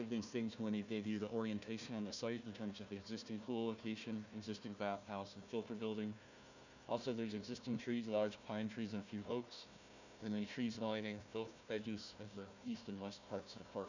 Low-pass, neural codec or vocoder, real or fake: 7.2 kHz; codec, 16 kHz, 1 kbps, FunCodec, trained on Chinese and English, 50 frames a second; fake